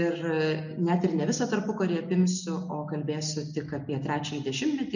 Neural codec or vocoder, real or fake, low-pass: none; real; 7.2 kHz